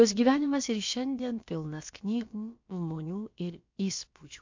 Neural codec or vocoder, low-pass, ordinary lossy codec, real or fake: codec, 16 kHz, about 1 kbps, DyCAST, with the encoder's durations; 7.2 kHz; MP3, 48 kbps; fake